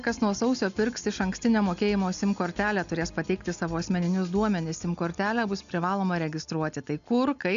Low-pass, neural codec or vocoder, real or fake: 7.2 kHz; none; real